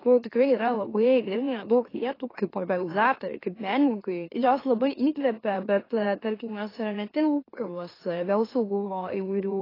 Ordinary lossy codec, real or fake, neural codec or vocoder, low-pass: AAC, 24 kbps; fake; autoencoder, 44.1 kHz, a latent of 192 numbers a frame, MeloTTS; 5.4 kHz